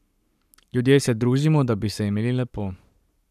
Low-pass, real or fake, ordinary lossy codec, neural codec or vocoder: 14.4 kHz; fake; none; codec, 44.1 kHz, 7.8 kbps, Pupu-Codec